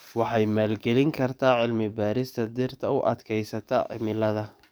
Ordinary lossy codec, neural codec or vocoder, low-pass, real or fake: none; codec, 44.1 kHz, 7.8 kbps, DAC; none; fake